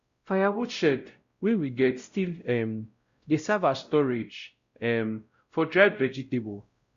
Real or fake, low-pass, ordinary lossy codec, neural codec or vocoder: fake; 7.2 kHz; Opus, 64 kbps; codec, 16 kHz, 0.5 kbps, X-Codec, WavLM features, trained on Multilingual LibriSpeech